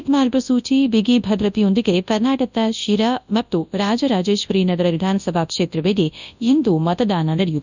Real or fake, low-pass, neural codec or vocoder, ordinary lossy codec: fake; 7.2 kHz; codec, 24 kHz, 0.9 kbps, WavTokenizer, large speech release; none